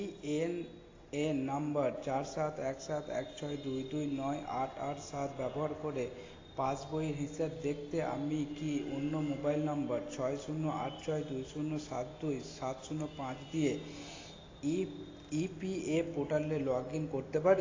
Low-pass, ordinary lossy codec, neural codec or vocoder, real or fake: 7.2 kHz; AAC, 32 kbps; none; real